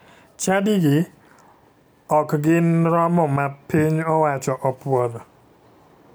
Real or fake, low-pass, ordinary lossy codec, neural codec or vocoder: real; none; none; none